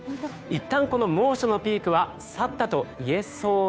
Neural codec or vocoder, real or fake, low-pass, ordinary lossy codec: codec, 16 kHz, 2 kbps, FunCodec, trained on Chinese and English, 25 frames a second; fake; none; none